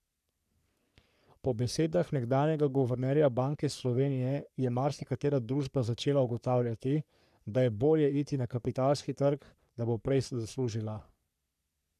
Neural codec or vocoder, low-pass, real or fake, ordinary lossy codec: codec, 44.1 kHz, 3.4 kbps, Pupu-Codec; 14.4 kHz; fake; none